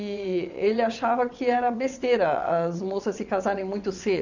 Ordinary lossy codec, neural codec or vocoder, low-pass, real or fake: none; vocoder, 22.05 kHz, 80 mel bands, WaveNeXt; 7.2 kHz; fake